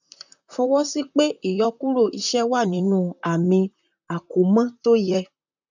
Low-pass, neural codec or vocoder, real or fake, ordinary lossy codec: 7.2 kHz; vocoder, 44.1 kHz, 128 mel bands, Pupu-Vocoder; fake; none